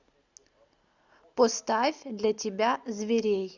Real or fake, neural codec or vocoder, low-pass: real; none; 7.2 kHz